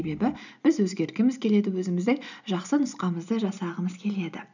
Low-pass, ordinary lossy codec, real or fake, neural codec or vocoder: 7.2 kHz; none; real; none